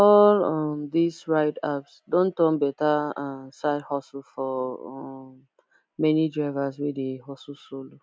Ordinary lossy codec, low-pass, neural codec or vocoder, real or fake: none; none; none; real